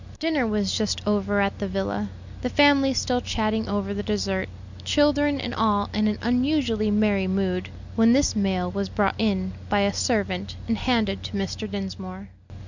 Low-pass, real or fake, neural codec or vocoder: 7.2 kHz; real; none